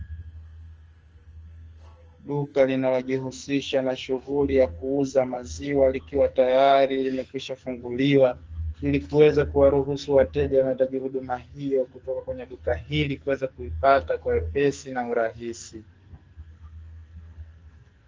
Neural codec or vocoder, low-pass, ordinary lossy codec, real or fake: codec, 44.1 kHz, 2.6 kbps, SNAC; 7.2 kHz; Opus, 24 kbps; fake